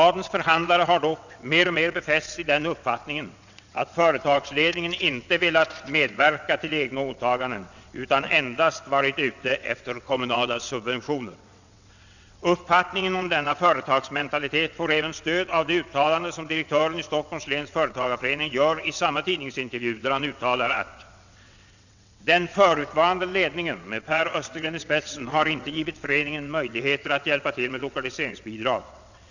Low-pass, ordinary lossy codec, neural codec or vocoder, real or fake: 7.2 kHz; none; vocoder, 22.05 kHz, 80 mel bands, WaveNeXt; fake